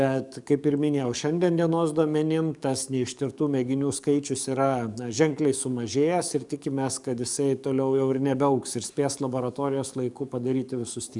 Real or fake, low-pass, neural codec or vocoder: fake; 10.8 kHz; codec, 44.1 kHz, 7.8 kbps, DAC